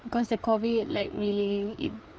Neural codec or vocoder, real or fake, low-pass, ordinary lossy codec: codec, 16 kHz, 4 kbps, FreqCodec, larger model; fake; none; none